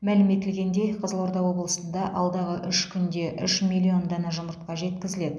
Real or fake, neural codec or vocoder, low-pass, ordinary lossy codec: real; none; none; none